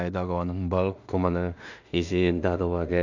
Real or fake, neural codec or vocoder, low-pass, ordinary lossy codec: fake; codec, 16 kHz in and 24 kHz out, 0.4 kbps, LongCat-Audio-Codec, two codebook decoder; 7.2 kHz; none